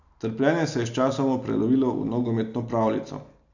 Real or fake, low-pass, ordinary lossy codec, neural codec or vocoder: real; 7.2 kHz; none; none